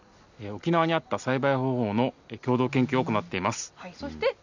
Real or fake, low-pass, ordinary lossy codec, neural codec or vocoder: real; 7.2 kHz; none; none